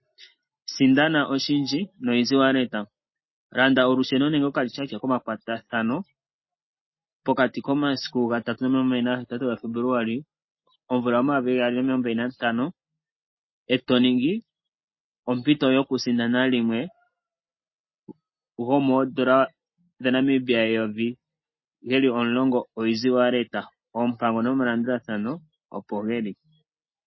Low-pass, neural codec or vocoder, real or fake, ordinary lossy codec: 7.2 kHz; none; real; MP3, 24 kbps